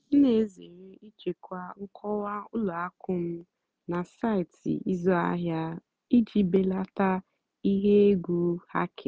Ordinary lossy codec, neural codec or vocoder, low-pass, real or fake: none; none; none; real